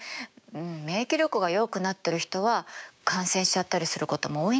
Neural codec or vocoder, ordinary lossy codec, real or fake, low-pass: codec, 16 kHz, 6 kbps, DAC; none; fake; none